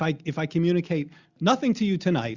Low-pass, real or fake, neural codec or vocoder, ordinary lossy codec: 7.2 kHz; real; none; Opus, 64 kbps